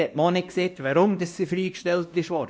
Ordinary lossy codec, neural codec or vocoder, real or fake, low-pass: none; codec, 16 kHz, 1 kbps, X-Codec, WavLM features, trained on Multilingual LibriSpeech; fake; none